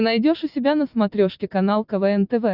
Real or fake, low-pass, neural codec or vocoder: real; 5.4 kHz; none